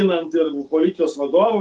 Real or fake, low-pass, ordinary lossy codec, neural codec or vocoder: real; 9.9 kHz; Opus, 24 kbps; none